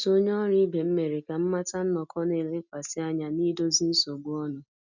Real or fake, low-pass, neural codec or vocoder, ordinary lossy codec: real; 7.2 kHz; none; none